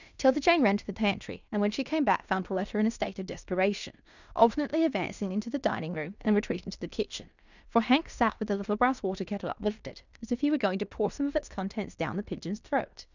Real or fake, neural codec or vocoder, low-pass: fake; codec, 16 kHz in and 24 kHz out, 0.9 kbps, LongCat-Audio-Codec, fine tuned four codebook decoder; 7.2 kHz